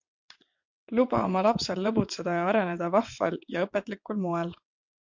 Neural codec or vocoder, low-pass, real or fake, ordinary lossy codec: vocoder, 22.05 kHz, 80 mel bands, WaveNeXt; 7.2 kHz; fake; MP3, 48 kbps